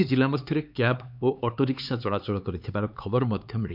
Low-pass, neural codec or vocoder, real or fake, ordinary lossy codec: 5.4 kHz; codec, 16 kHz, 4 kbps, X-Codec, HuBERT features, trained on LibriSpeech; fake; none